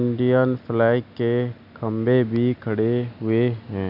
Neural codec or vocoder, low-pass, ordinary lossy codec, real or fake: none; 5.4 kHz; none; real